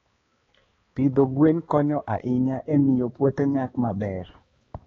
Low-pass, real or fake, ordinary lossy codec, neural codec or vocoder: 7.2 kHz; fake; AAC, 24 kbps; codec, 16 kHz, 2 kbps, X-Codec, HuBERT features, trained on balanced general audio